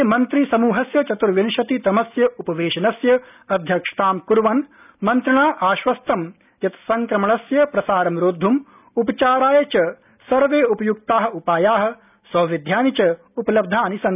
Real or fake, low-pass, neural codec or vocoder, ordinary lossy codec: real; 3.6 kHz; none; none